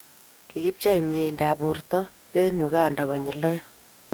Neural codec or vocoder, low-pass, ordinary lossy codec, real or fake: codec, 44.1 kHz, 2.6 kbps, DAC; none; none; fake